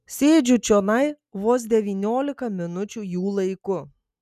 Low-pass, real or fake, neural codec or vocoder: 14.4 kHz; real; none